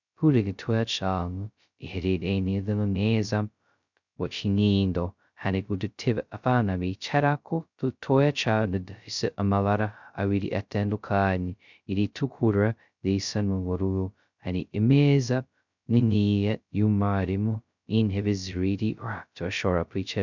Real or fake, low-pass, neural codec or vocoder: fake; 7.2 kHz; codec, 16 kHz, 0.2 kbps, FocalCodec